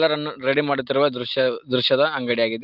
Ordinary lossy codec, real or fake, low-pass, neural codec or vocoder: Opus, 24 kbps; real; 5.4 kHz; none